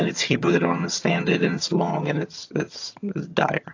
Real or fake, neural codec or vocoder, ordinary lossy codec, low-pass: fake; vocoder, 22.05 kHz, 80 mel bands, HiFi-GAN; AAC, 48 kbps; 7.2 kHz